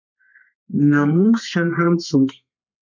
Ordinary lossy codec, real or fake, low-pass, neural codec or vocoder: MP3, 64 kbps; fake; 7.2 kHz; codec, 32 kHz, 1.9 kbps, SNAC